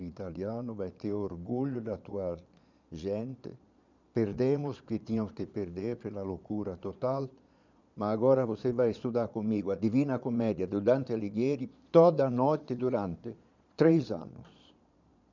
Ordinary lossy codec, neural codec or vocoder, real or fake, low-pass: none; vocoder, 22.05 kHz, 80 mel bands, Vocos; fake; 7.2 kHz